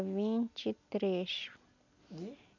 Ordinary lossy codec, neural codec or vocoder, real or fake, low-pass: none; none; real; 7.2 kHz